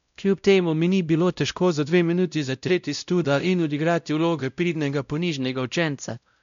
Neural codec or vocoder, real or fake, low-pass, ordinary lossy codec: codec, 16 kHz, 0.5 kbps, X-Codec, WavLM features, trained on Multilingual LibriSpeech; fake; 7.2 kHz; none